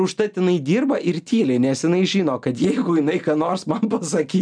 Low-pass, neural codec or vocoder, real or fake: 9.9 kHz; none; real